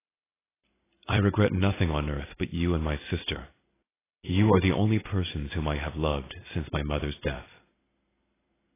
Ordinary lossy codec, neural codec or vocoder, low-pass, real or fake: AAC, 16 kbps; vocoder, 44.1 kHz, 128 mel bands every 256 samples, BigVGAN v2; 3.6 kHz; fake